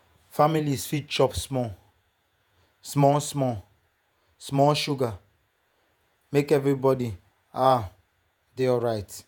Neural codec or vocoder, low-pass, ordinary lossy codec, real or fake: vocoder, 48 kHz, 128 mel bands, Vocos; none; none; fake